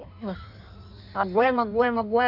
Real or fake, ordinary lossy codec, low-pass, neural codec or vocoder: fake; none; 5.4 kHz; codec, 16 kHz in and 24 kHz out, 1.1 kbps, FireRedTTS-2 codec